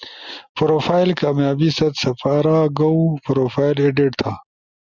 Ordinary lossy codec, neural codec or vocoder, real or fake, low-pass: Opus, 64 kbps; none; real; 7.2 kHz